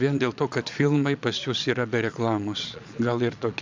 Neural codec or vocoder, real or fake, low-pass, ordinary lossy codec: vocoder, 22.05 kHz, 80 mel bands, Vocos; fake; 7.2 kHz; MP3, 64 kbps